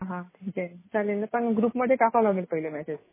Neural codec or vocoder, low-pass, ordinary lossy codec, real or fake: none; 3.6 kHz; MP3, 16 kbps; real